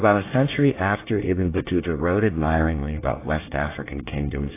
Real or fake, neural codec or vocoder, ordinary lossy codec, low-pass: fake; codec, 24 kHz, 1 kbps, SNAC; AAC, 24 kbps; 3.6 kHz